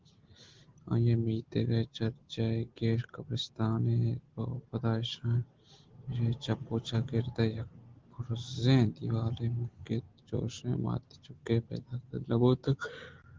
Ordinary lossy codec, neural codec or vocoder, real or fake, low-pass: Opus, 16 kbps; none; real; 7.2 kHz